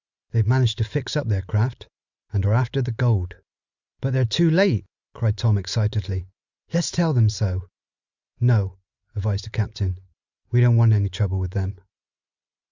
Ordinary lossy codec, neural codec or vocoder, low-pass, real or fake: Opus, 64 kbps; none; 7.2 kHz; real